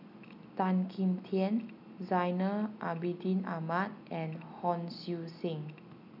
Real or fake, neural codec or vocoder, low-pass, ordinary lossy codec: real; none; 5.4 kHz; none